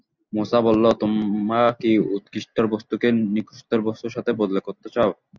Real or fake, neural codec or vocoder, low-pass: real; none; 7.2 kHz